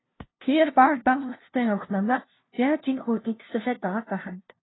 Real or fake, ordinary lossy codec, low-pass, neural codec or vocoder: fake; AAC, 16 kbps; 7.2 kHz; codec, 16 kHz, 0.5 kbps, FunCodec, trained on LibriTTS, 25 frames a second